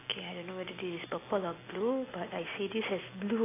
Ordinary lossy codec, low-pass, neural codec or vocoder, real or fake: AAC, 16 kbps; 3.6 kHz; none; real